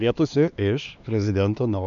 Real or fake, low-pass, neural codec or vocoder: fake; 7.2 kHz; codec, 16 kHz, 2 kbps, X-Codec, HuBERT features, trained on LibriSpeech